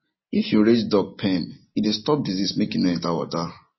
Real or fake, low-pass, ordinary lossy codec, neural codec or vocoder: real; 7.2 kHz; MP3, 24 kbps; none